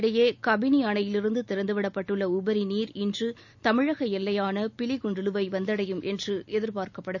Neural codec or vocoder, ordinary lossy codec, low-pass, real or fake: none; none; 7.2 kHz; real